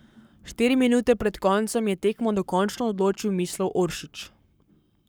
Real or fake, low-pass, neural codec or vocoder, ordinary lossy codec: fake; none; codec, 44.1 kHz, 7.8 kbps, Pupu-Codec; none